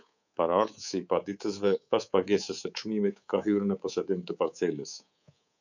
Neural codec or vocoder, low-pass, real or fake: codec, 24 kHz, 3.1 kbps, DualCodec; 7.2 kHz; fake